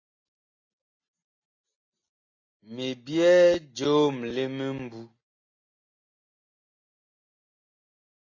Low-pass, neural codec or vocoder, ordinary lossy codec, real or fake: 7.2 kHz; none; AAC, 32 kbps; real